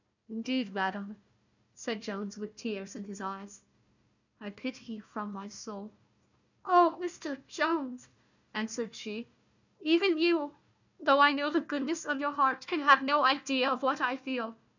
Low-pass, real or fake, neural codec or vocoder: 7.2 kHz; fake; codec, 16 kHz, 1 kbps, FunCodec, trained on Chinese and English, 50 frames a second